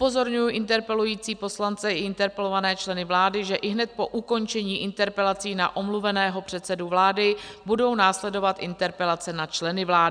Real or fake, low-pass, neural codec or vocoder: real; 9.9 kHz; none